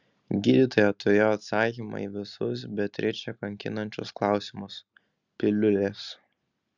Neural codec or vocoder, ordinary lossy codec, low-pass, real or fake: none; Opus, 64 kbps; 7.2 kHz; real